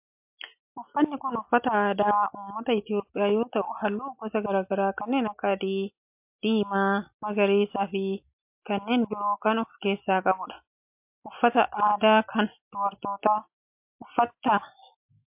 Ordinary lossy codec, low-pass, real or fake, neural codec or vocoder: MP3, 32 kbps; 3.6 kHz; real; none